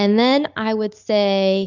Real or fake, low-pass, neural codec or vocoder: real; 7.2 kHz; none